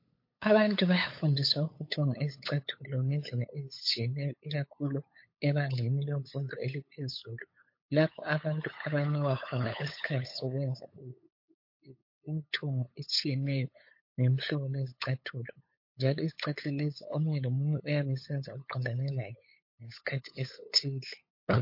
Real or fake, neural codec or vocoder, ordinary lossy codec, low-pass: fake; codec, 16 kHz, 8 kbps, FunCodec, trained on LibriTTS, 25 frames a second; MP3, 32 kbps; 5.4 kHz